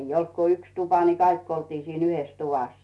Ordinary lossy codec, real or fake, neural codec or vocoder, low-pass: none; real; none; none